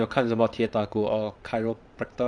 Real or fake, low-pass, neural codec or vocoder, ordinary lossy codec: fake; 9.9 kHz; vocoder, 22.05 kHz, 80 mel bands, Vocos; none